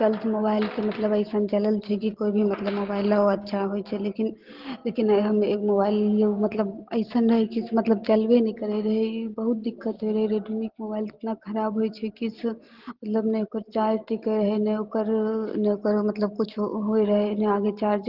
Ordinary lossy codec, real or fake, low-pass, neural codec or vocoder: Opus, 16 kbps; real; 5.4 kHz; none